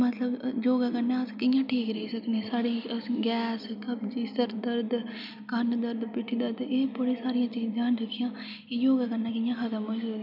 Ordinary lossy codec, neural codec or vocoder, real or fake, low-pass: none; none; real; 5.4 kHz